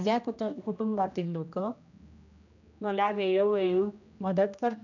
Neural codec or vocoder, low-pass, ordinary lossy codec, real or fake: codec, 16 kHz, 1 kbps, X-Codec, HuBERT features, trained on general audio; 7.2 kHz; none; fake